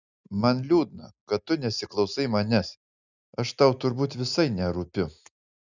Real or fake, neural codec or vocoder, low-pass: real; none; 7.2 kHz